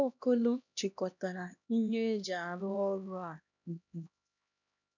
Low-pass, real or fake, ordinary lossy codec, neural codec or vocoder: 7.2 kHz; fake; none; codec, 16 kHz, 1 kbps, X-Codec, HuBERT features, trained on LibriSpeech